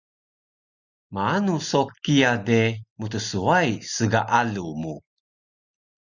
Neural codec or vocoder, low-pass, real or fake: none; 7.2 kHz; real